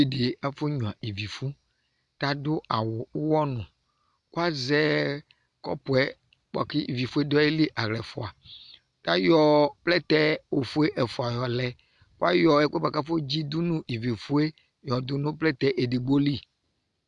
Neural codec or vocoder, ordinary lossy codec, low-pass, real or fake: none; MP3, 96 kbps; 10.8 kHz; real